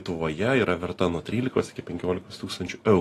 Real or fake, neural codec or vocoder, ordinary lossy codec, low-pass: real; none; AAC, 48 kbps; 14.4 kHz